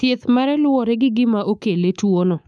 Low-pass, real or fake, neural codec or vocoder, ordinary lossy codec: none; fake; codec, 24 kHz, 3.1 kbps, DualCodec; none